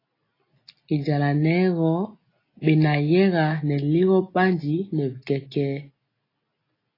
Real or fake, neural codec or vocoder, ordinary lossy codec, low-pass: real; none; AAC, 24 kbps; 5.4 kHz